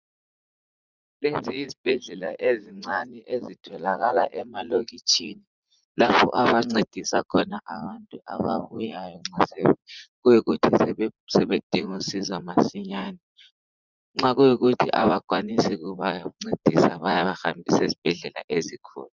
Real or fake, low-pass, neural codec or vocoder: fake; 7.2 kHz; vocoder, 44.1 kHz, 80 mel bands, Vocos